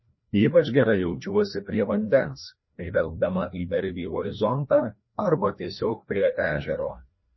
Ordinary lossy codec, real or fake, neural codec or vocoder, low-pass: MP3, 24 kbps; fake; codec, 16 kHz, 1 kbps, FreqCodec, larger model; 7.2 kHz